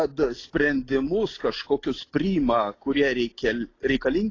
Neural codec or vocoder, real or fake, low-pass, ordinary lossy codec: none; real; 7.2 kHz; AAC, 32 kbps